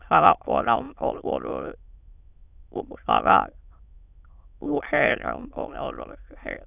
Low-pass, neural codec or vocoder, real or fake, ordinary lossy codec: 3.6 kHz; autoencoder, 22.05 kHz, a latent of 192 numbers a frame, VITS, trained on many speakers; fake; none